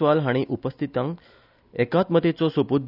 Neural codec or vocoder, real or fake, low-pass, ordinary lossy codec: none; real; 5.4 kHz; none